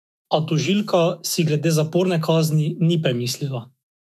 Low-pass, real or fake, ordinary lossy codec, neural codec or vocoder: 14.4 kHz; fake; none; autoencoder, 48 kHz, 128 numbers a frame, DAC-VAE, trained on Japanese speech